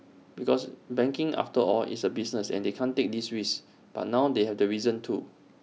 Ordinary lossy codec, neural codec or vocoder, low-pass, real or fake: none; none; none; real